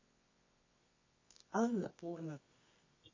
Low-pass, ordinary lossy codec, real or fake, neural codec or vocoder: 7.2 kHz; MP3, 32 kbps; fake; codec, 24 kHz, 0.9 kbps, WavTokenizer, medium music audio release